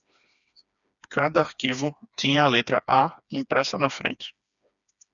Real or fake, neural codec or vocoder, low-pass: fake; codec, 16 kHz, 2 kbps, FreqCodec, smaller model; 7.2 kHz